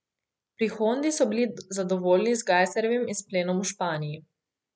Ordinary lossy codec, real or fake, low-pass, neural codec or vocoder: none; real; none; none